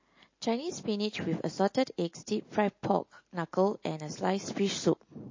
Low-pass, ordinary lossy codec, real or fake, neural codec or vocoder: 7.2 kHz; MP3, 32 kbps; real; none